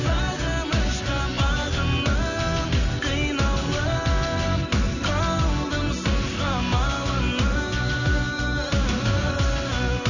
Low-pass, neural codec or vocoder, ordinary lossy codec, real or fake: 7.2 kHz; none; none; real